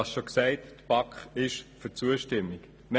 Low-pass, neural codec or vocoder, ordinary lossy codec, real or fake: none; none; none; real